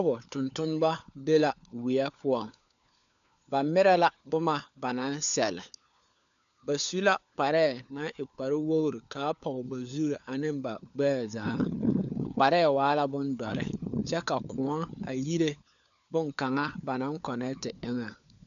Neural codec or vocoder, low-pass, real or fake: codec, 16 kHz, 4 kbps, FunCodec, trained on LibriTTS, 50 frames a second; 7.2 kHz; fake